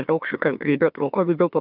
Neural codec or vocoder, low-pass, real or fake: autoencoder, 44.1 kHz, a latent of 192 numbers a frame, MeloTTS; 5.4 kHz; fake